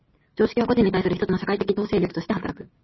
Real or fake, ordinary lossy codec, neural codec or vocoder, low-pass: real; MP3, 24 kbps; none; 7.2 kHz